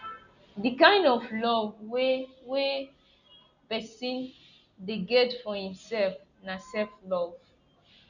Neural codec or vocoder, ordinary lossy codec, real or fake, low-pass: none; none; real; 7.2 kHz